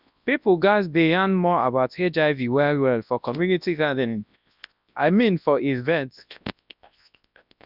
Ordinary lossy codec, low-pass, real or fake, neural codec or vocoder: none; 5.4 kHz; fake; codec, 24 kHz, 0.9 kbps, WavTokenizer, large speech release